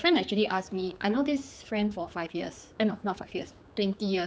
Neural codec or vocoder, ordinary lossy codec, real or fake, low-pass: codec, 16 kHz, 4 kbps, X-Codec, HuBERT features, trained on general audio; none; fake; none